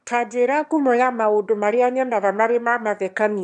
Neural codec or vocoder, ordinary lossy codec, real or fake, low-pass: autoencoder, 22.05 kHz, a latent of 192 numbers a frame, VITS, trained on one speaker; none; fake; 9.9 kHz